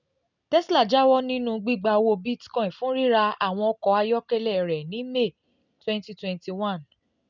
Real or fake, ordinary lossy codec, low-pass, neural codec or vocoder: real; none; 7.2 kHz; none